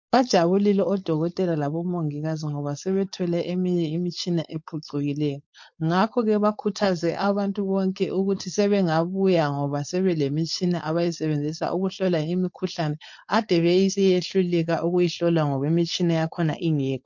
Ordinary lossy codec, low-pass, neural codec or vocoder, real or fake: MP3, 48 kbps; 7.2 kHz; codec, 16 kHz, 4.8 kbps, FACodec; fake